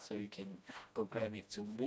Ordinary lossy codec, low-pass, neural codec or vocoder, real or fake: none; none; codec, 16 kHz, 1 kbps, FreqCodec, smaller model; fake